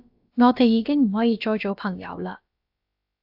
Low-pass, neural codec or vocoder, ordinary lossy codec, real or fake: 5.4 kHz; codec, 16 kHz, about 1 kbps, DyCAST, with the encoder's durations; AAC, 48 kbps; fake